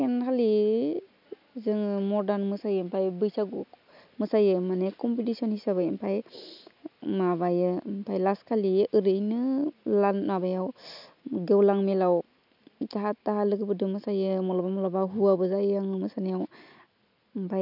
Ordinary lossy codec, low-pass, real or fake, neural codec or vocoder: none; 5.4 kHz; real; none